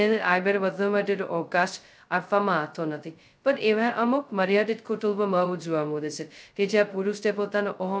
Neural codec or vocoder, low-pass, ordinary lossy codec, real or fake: codec, 16 kHz, 0.2 kbps, FocalCodec; none; none; fake